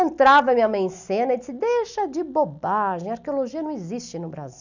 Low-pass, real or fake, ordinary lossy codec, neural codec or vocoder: 7.2 kHz; real; none; none